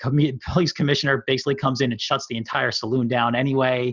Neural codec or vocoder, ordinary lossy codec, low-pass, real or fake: none; Opus, 64 kbps; 7.2 kHz; real